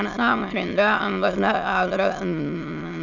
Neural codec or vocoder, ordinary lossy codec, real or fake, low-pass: autoencoder, 22.05 kHz, a latent of 192 numbers a frame, VITS, trained on many speakers; none; fake; 7.2 kHz